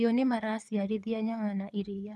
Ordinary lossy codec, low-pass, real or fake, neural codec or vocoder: none; none; fake; codec, 24 kHz, 6 kbps, HILCodec